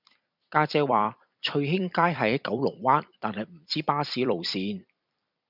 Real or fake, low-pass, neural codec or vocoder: fake; 5.4 kHz; vocoder, 44.1 kHz, 128 mel bands every 256 samples, BigVGAN v2